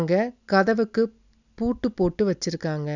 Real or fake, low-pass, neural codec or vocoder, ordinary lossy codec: real; 7.2 kHz; none; none